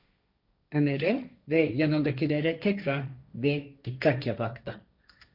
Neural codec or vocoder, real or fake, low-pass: codec, 16 kHz, 1.1 kbps, Voila-Tokenizer; fake; 5.4 kHz